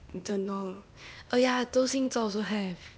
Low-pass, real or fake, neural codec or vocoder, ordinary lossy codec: none; fake; codec, 16 kHz, 0.8 kbps, ZipCodec; none